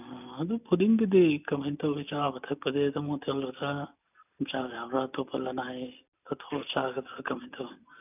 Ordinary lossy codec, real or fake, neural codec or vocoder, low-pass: none; real; none; 3.6 kHz